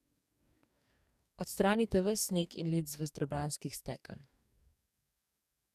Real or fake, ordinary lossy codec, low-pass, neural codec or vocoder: fake; none; 14.4 kHz; codec, 44.1 kHz, 2.6 kbps, DAC